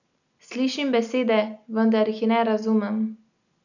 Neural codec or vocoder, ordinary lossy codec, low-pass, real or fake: none; none; 7.2 kHz; real